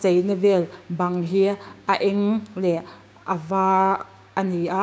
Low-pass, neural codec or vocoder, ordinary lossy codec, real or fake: none; codec, 16 kHz, 6 kbps, DAC; none; fake